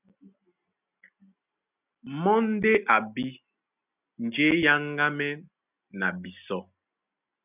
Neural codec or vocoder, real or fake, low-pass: none; real; 3.6 kHz